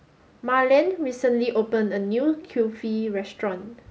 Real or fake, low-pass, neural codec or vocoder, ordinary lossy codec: real; none; none; none